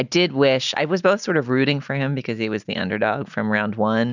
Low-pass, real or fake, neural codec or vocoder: 7.2 kHz; real; none